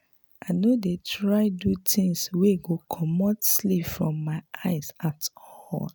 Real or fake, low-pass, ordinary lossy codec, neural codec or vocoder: real; none; none; none